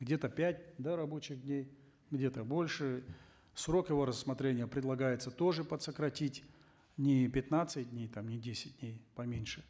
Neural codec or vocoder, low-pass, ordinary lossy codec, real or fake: none; none; none; real